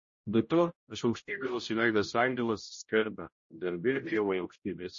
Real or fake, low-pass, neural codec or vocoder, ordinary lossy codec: fake; 7.2 kHz; codec, 16 kHz, 0.5 kbps, X-Codec, HuBERT features, trained on general audio; MP3, 32 kbps